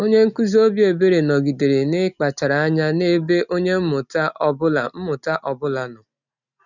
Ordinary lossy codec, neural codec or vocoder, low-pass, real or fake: none; none; 7.2 kHz; real